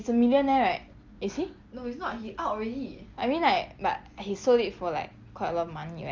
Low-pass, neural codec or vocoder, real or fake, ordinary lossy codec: 7.2 kHz; none; real; Opus, 24 kbps